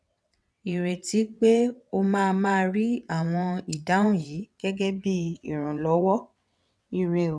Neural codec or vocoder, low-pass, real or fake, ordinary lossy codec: vocoder, 22.05 kHz, 80 mel bands, WaveNeXt; none; fake; none